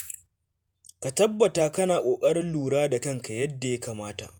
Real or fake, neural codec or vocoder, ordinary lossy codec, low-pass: fake; vocoder, 48 kHz, 128 mel bands, Vocos; none; none